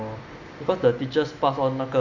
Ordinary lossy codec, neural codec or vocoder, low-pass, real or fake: none; none; 7.2 kHz; real